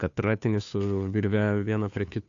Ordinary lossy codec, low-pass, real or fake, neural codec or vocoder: AAC, 64 kbps; 7.2 kHz; fake; codec, 16 kHz, 2 kbps, FunCodec, trained on Chinese and English, 25 frames a second